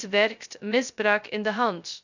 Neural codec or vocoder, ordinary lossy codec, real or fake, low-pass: codec, 16 kHz, 0.2 kbps, FocalCodec; none; fake; 7.2 kHz